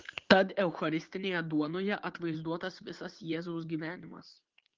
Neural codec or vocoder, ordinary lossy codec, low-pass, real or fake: vocoder, 44.1 kHz, 80 mel bands, Vocos; Opus, 16 kbps; 7.2 kHz; fake